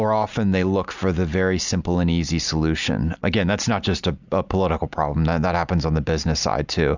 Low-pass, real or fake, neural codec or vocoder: 7.2 kHz; real; none